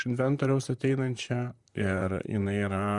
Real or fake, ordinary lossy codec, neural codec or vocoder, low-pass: fake; Opus, 64 kbps; vocoder, 44.1 kHz, 128 mel bands, Pupu-Vocoder; 10.8 kHz